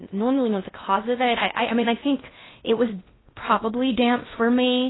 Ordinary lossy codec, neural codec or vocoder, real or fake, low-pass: AAC, 16 kbps; codec, 16 kHz in and 24 kHz out, 0.6 kbps, FocalCodec, streaming, 2048 codes; fake; 7.2 kHz